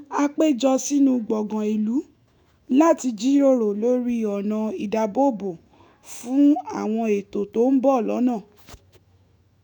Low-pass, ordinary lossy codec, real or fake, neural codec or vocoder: 19.8 kHz; none; fake; autoencoder, 48 kHz, 128 numbers a frame, DAC-VAE, trained on Japanese speech